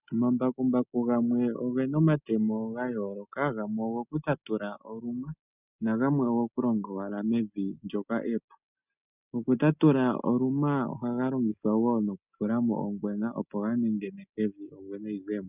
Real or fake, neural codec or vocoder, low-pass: real; none; 3.6 kHz